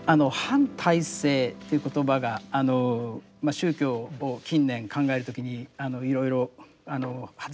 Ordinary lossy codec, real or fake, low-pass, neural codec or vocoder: none; real; none; none